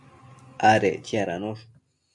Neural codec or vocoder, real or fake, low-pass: none; real; 10.8 kHz